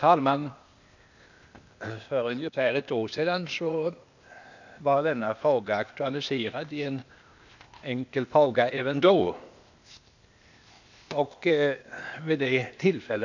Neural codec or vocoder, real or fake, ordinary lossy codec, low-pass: codec, 16 kHz, 0.8 kbps, ZipCodec; fake; none; 7.2 kHz